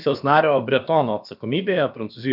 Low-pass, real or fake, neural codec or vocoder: 5.4 kHz; fake; codec, 16 kHz, about 1 kbps, DyCAST, with the encoder's durations